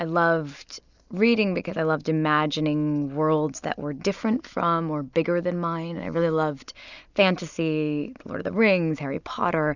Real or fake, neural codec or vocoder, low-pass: real; none; 7.2 kHz